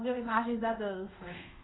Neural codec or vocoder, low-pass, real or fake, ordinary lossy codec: codec, 24 kHz, 0.5 kbps, DualCodec; 7.2 kHz; fake; AAC, 16 kbps